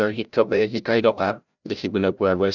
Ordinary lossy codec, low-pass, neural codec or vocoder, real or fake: none; 7.2 kHz; codec, 16 kHz, 0.5 kbps, FreqCodec, larger model; fake